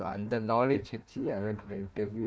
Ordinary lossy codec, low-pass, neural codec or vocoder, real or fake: none; none; codec, 16 kHz, 1 kbps, FunCodec, trained on Chinese and English, 50 frames a second; fake